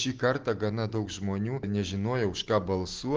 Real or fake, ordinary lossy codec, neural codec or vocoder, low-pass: real; Opus, 16 kbps; none; 7.2 kHz